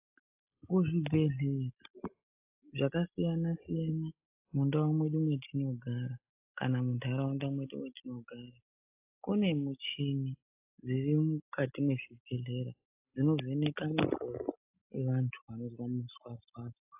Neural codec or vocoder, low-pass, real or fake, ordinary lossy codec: none; 3.6 kHz; real; AAC, 32 kbps